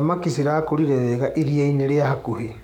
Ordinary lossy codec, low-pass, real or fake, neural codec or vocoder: none; 19.8 kHz; fake; codec, 44.1 kHz, 7.8 kbps, DAC